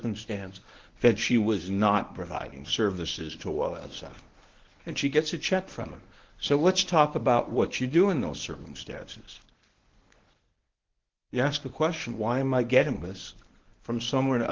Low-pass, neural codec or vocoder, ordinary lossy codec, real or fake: 7.2 kHz; codec, 24 kHz, 0.9 kbps, WavTokenizer, medium speech release version 1; Opus, 16 kbps; fake